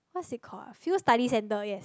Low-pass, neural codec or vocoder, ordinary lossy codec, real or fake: none; none; none; real